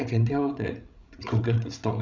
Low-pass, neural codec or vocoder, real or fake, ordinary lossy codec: 7.2 kHz; codec, 16 kHz, 16 kbps, FreqCodec, larger model; fake; none